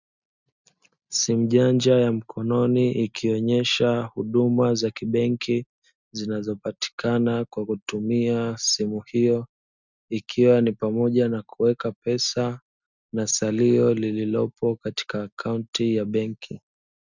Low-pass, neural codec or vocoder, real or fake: 7.2 kHz; none; real